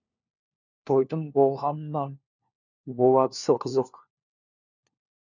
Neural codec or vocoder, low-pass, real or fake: codec, 16 kHz, 1 kbps, FunCodec, trained on LibriTTS, 50 frames a second; 7.2 kHz; fake